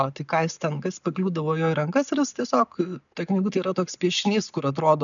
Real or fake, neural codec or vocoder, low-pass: real; none; 7.2 kHz